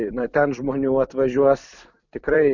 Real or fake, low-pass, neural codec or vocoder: fake; 7.2 kHz; vocoder, 44.1 kHz, 128 mel bands every 256 samples, BigVGAN v2